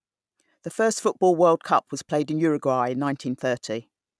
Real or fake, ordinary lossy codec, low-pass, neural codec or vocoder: real; none; none; none